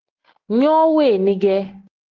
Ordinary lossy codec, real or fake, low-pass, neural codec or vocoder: Opus, 16 kbps; real; 7.2 kHz; none